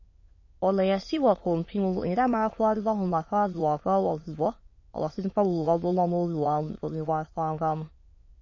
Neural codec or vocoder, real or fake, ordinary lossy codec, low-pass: autoencoder, 22.05 kHz, a latent of 192 numbers a frame, VITS, trained on many speakers; fake; MP3, 32 kbps; 7.2 kHz